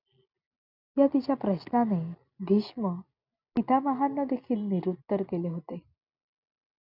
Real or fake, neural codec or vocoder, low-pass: real; none; 5.4 kHz